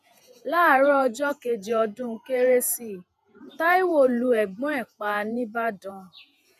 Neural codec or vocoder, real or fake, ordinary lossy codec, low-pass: vocoder, 48 kHz, 128 mel bands, Vocos; fake; none; 14.4 kHz